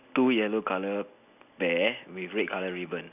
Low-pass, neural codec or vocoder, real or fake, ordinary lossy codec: 3.6 kHz; none; real; none